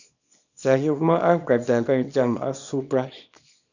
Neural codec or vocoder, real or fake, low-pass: codec, 24 kHz, 0.9 kbps, WavTokenizer, small release; fake; 7.2 kHz